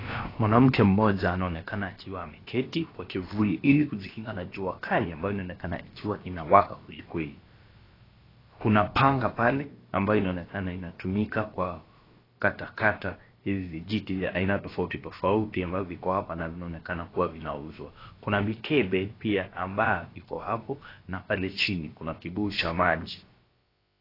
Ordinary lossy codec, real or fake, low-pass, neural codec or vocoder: AAC, 24 kbps; fake; 5.4 kHz; codec, 16 kHz, about 1 kbps, DyCAST, with the encoder's durations